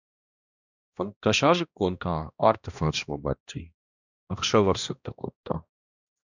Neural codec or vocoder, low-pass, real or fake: codec, 16 kHz, 1 kbps, X-Codec, HuBERT features, trained on balanced general audio; 7.2 kHz; fake